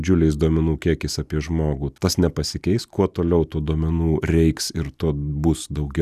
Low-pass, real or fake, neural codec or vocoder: 14.4 kHz; real; none